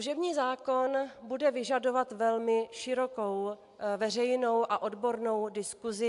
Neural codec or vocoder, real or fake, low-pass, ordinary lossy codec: none; real; 10.8 kHz; AAC, 64 kbps